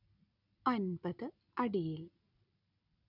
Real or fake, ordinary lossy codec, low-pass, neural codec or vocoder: real; none; 5.4 kHz; none